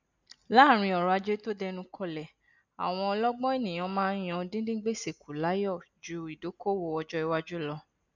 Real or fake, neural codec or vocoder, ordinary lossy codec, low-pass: real; none; none; 7.2 kHz